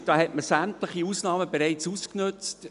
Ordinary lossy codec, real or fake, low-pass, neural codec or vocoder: none; real; 10.8 kHz; none